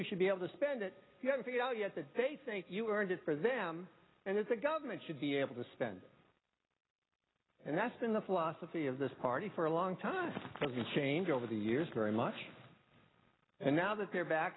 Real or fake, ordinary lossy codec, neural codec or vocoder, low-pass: real; AAC, 16 kbps; none; 7.2 kHz